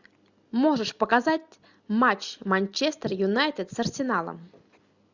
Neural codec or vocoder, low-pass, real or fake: none; 7.2 kHz; real